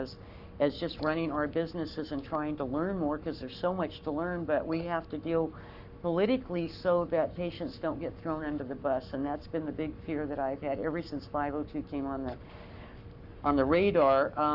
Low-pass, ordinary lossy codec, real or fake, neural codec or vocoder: 5.4 kHz; Opus, 64 kbps; fake; codec, 44.1 kHz, 7.8 kbps, Pupu-Codec